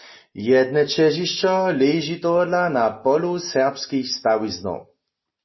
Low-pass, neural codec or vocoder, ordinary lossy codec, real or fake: 7.2 kHz; none; MP3, 24 kbps; real